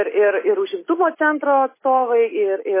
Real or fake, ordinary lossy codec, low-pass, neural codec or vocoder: real; MP3, 16 kbps; 3.6 kHz; none